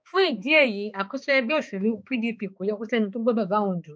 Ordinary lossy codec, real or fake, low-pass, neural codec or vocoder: none; fake; none; codec, 16 kHz, 2 kbps, X-Codec, HuBERT features, trained on balanced general audio